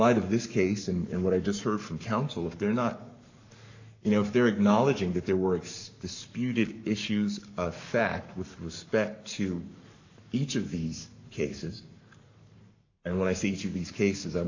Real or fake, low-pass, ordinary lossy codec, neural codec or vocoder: fake; 7.2 kHz; AAC, 48 kbps; codec, 44.1 kHz, 7.8 kbps, Pupu-Codec